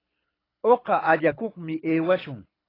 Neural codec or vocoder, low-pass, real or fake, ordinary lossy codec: codec, 24 kHz, 6 kbps, HILCodec; 5.4 kHz; fake; AAC, 24 kbps